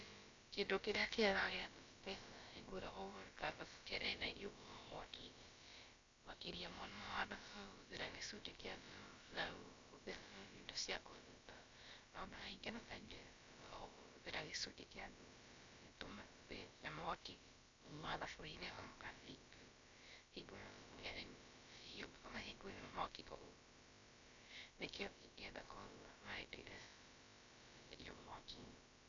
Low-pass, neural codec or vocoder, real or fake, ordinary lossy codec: 7.2 kHz; codec, 16 kHz, about 1 kbps, DyCAST, with the encoder's durations; fake; AAC, 48 kbps